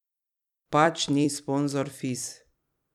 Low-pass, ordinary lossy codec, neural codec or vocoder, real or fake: 19.8 kHz; none; vocoder, 44.1 kHz, 128 mel bands every 256 samples, BigVGAN v2; fake